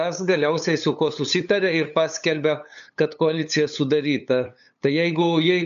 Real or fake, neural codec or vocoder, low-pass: fake; codec, 16 kHz, 8 kbps, FunCodec, trained on LibriTTS, 25 frames a second; 7.2 kHz